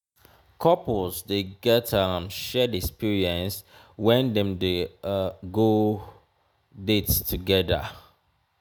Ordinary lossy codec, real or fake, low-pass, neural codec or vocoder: none; real; none; none